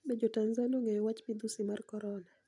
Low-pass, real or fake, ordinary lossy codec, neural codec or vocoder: 10.8 kHz; real; none; none